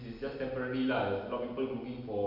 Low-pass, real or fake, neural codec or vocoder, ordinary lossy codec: 5.4 kHz; real; none; none